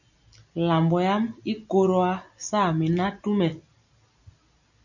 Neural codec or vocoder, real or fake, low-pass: none; real; 7.2 kHz